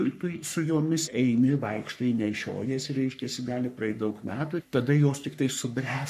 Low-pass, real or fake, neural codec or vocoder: 14.4 kHz; fake; codec, 44.1 kHz, 3.4 kbps, Pupu-Codec